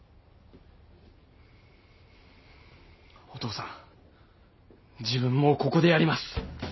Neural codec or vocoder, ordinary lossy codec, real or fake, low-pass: none; MP3, 24 kbps; real; 7.2 kHz